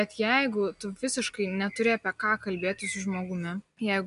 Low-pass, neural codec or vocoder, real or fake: 10.8 kHz; none; real